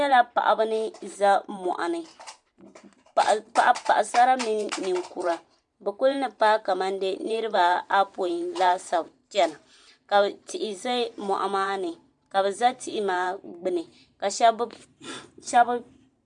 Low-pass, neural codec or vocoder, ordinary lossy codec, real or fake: 10.8 kHz; vocoder, 44.1 kHz, 128 mel bands every 512 samples, BigVGAN v2; MP3, 64 kbps; fake